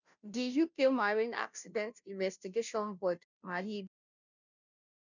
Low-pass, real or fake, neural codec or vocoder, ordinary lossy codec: 7.2 kHz; fake; codec, 16 kHz, 0.5 kbps, FunCodec, trained on Chinese and English, 25 frames a second; none